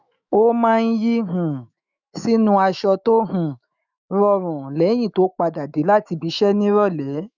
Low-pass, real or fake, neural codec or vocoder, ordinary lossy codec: 7.2 kHz; fake; autoencoder, 48 kHz, 128 numbers a frame, DAC-VAE, trained on Japanese speech; none